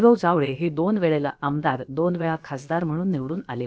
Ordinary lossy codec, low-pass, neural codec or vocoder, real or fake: none; none; codec, 16 kHz, about 1 kbps, DyCAST, with the encoder's durations; fake